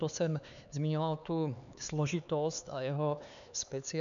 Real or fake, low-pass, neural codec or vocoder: fake; 7.2 kHz; codec, 16 kHz, 4 kbps, X-Codec, HuBERT features, trained on LibriSpeech